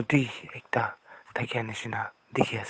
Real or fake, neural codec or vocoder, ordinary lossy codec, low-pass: fake; codec, 16 kHz, 8 kbps, FunCodec, trained on Chinese and English, 25 frames a second; none; none